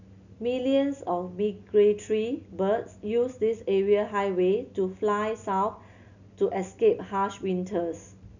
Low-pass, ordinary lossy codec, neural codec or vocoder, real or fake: 7.2 kHz; none; none; real